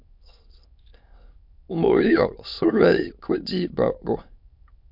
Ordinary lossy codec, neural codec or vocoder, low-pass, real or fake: MP3, 48 kbps; autoencoder, 22.05 kHz, a latent of 192 numbers a frame, VITS, trained on many speakers; 5.4 kHz; fake